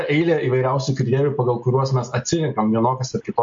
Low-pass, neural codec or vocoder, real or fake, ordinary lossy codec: 7.2 kHz; none; real; MP3, 48 kbps